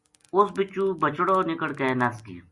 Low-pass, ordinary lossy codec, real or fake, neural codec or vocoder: 10.8 kHz; Opus, 64 kbps; real; none